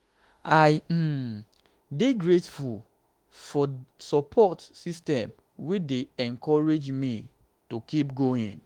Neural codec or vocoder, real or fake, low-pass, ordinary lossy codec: autoencoder, 48 kHz, 32 numbers a frame, DAC-VAE, trained on Japanese speech; fake; 19.8 kHz; Opus, 24 kbps